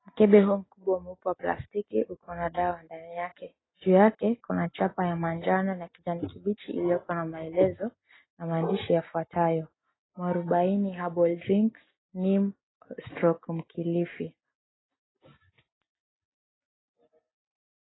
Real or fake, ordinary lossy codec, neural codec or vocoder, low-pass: real; AAC, 16 kbps; none; 7.2 kHz